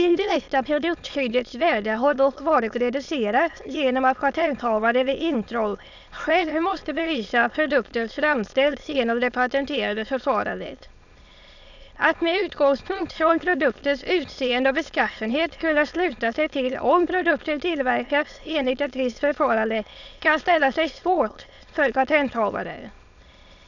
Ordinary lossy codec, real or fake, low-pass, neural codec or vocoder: none; fake; 7.2 kHz; autoencoder, 22.05 kHz, a latent of 192 numbers a frame, VITS, trained on many speakers